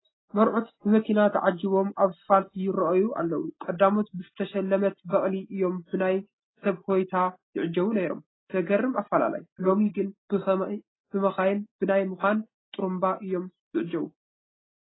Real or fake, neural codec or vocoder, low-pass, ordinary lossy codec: real; none; 7.2 kHz; AAC, 16 kbps